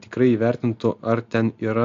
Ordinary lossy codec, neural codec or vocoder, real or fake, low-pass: AAC, 64 kbps; none; real; 7.2 kHz